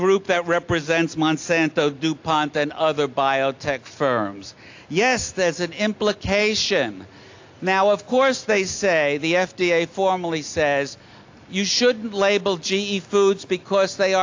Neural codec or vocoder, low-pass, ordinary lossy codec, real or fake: none; 7.2 kHz; AAC, 48 kbps; real